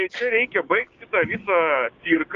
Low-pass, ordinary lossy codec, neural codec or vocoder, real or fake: 7.2 kHz; Opus, 24 kbps; codec, 16 kHz, 6 kbps, DAC; fake